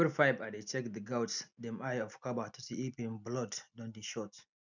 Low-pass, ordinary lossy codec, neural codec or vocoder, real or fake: 7.2 kHz; none; none; real